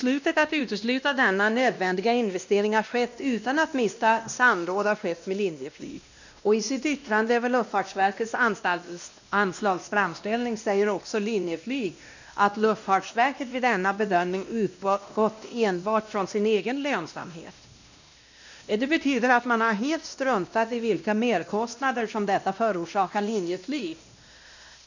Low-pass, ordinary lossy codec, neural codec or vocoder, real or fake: 7.2 kHz; none; codec, 16 kHz, 1 kbps, X-Codec, WavLM features, trained on Multilingual LibriSpeech; fake